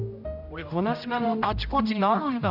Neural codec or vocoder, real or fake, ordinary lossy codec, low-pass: codec, 16 kHz, 0.5 kbps, X-Codec, HuBERT features, trained on general audio; fake; none; 5.4 kHz